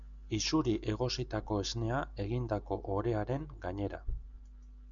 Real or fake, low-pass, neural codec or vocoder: real; 7.2 kHz; none